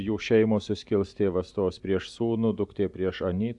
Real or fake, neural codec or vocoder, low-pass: real; none; 10.8 kHz